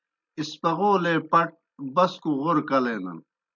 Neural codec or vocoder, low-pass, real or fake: none; 7.2 kHz; real